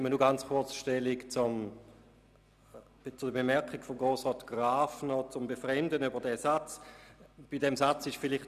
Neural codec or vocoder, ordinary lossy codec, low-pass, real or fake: none; none; 14.4 kHz; real